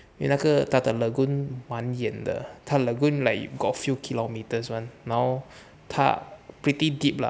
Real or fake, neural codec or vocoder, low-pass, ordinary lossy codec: real; none; none; none